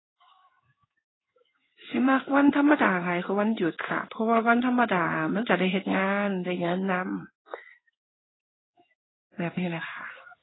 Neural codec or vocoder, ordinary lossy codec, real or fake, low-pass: codec, 16 kHz in and 24 kHz out, 1 kbps, XY-Tokenizer; AAC, 16 kbps; fake; 7.2 kHz